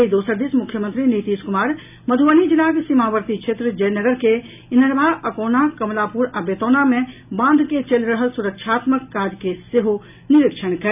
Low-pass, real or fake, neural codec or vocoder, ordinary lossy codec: 3.6 kHz; real; none; none